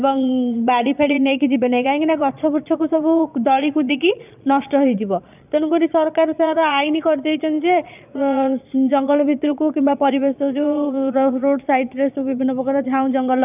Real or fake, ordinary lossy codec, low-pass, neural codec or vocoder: fake; none; 3.6 kHz; vocoder, 22.05 kHz, 80 mel bands, Vocos